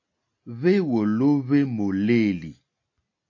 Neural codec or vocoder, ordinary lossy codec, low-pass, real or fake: none; AAC, 48 kbps; 7.2 kHz; real